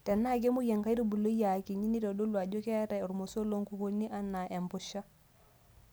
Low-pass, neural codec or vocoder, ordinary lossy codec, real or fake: none; none; none; real